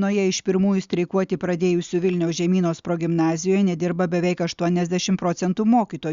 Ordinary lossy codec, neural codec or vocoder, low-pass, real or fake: Opus, 64 kbps; none; 7.2 kHz; real